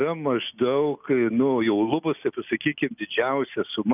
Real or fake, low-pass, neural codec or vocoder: real; 3.6 kHz; none